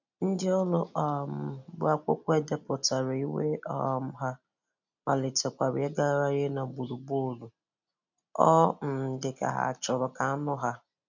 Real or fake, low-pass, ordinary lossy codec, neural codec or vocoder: real; 7.2 kHz; none; none